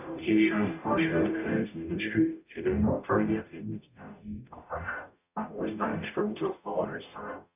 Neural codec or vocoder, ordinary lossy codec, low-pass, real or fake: codec, 44.1 kHz, 0.9 kbps, DAC; none; 3.6 kHz; fake